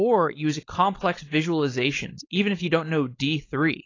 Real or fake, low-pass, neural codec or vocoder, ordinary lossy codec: fake; 7.2 kHz; codec, 16 kHz, 4.8 kbps, FACodec; AAC, 32 kbps